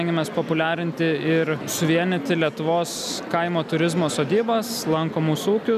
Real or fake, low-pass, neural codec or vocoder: real; 14.4 kHz; none